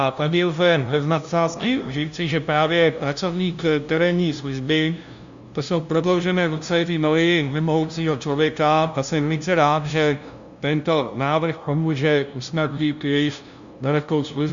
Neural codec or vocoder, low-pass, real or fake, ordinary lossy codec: codec, 16 kHz, 0.5 kbps, FunCodec, trained on LibriTTS, 25 frames a second; 7.2 kHz; fake; Opus, 64 kbps